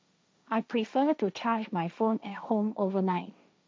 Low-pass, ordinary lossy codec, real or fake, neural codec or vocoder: none; none; fake; codec, 16 kHz, 1.1 kbps, Voila-Tokenizer